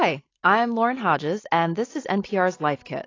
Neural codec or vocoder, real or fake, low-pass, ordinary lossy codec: none; real; 7.2 kHz; AAC, 32 kbps